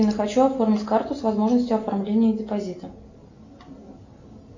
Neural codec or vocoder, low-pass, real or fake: none; 7.2 kHz; real